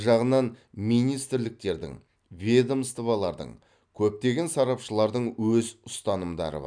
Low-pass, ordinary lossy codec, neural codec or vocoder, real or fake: 9.9 kHz; none; none; real